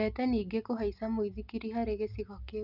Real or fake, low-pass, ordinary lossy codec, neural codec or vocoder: real; 5.4 kHz; none; none